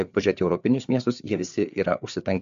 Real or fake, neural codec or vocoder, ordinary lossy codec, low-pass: fake; codec, 16 kHz, 4 kbps, FreqCodec, larger model; MP3, 64 kbps; 7.2 kHz